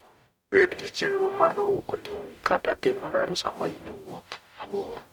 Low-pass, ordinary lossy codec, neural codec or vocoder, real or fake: 19.8 kHz; none; codec, 44.1 kHz, 0.9 kbps, DAC; fake